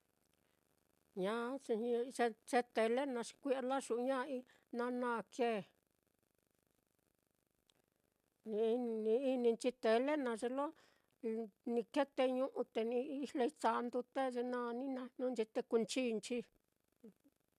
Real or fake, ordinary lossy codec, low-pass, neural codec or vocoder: real; MP3, 96 kbps; 14.4 kHz; none